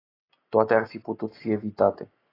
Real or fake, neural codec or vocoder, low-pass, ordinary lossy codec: real; none; 5.4 kHz; AAC, 24 kbps